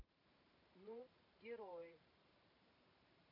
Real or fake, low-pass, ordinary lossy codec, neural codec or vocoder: fake; 5.4 kHz; none; vocoder, 44.1 kHz, 128 mel bands every 512 samples, BigVGAN v2